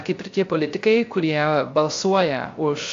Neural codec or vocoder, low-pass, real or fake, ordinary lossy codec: codec, 16 kHz, 0.3 kbps, FocalCodec; 7.2 kHz; fake; AAC, 48 kbps